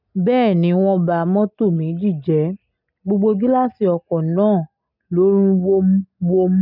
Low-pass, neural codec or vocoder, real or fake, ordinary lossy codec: 5.4 kHz; none; real; none